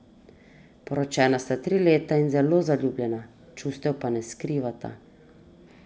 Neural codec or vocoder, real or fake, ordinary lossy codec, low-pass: none; real; none; none